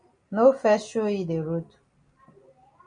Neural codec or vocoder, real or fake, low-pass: none; real; 9.9 kHz